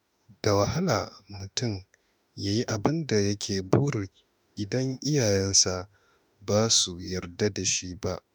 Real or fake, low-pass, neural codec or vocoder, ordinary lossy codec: fake; none; autoencoder, 48 kHz, 32 numbers a frame, DAC-VAE, trained on Japanese speech; none